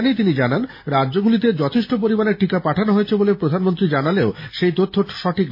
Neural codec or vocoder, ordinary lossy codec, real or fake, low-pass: none; MP3, 24 kbps; real; 5.4 kHz